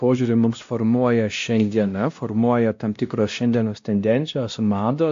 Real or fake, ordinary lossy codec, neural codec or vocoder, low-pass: fake; AAC, 64 kbps; codec, 16 kHz, 1 kbps, X-Codec, WavLM features, trained on Multilingual LibriSpeech; 7.2 kHz